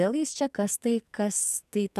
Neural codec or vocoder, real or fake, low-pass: codec, 44.1 kHz, 2.6 kbps, SNAC; fake; 14.4 kHz